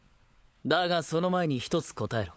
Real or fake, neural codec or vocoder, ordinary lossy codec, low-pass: fake; codec, 16 kHz, 16 kbps, FunCodec, trained on LibriTTS, 50 frames a second; none; none